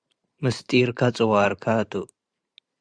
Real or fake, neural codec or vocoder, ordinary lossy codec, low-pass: fake; vocoder, 44.1 kHz, 128 mel bands every 512 samples, BigVGAN v2; Opus, 64 kbps; 9.9 kHz